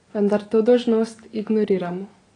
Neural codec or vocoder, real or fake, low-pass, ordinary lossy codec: none; real; 9.9 kHz; AAC, 32 kbps